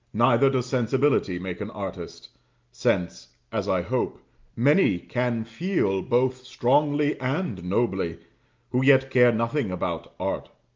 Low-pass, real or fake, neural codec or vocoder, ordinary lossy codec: 7.2 kHz; real; none; Opus, 24 kbps